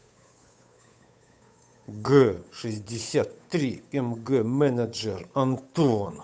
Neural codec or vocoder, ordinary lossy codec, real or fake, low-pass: codec, 16 kHz, 8 kbps, FunCodec, trained on Chinese and English, 25 frames a second; none; fake; none